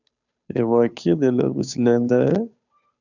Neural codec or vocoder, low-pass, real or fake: codec, 16 kHz, 2 kbps, FunCodec, trained on Chinese and English, 25 frames a second; 7.2 kHz; fake